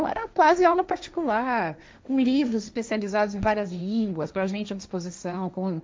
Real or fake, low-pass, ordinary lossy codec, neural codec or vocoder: fake; none; none; codec, 16 kHz, 1.1 kbps, Voila-Tokenizer